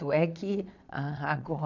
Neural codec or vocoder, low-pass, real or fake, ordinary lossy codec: vocoder, 22.05 kHz, 80 mel bands, Vocos; 7.2 kHz; fake; none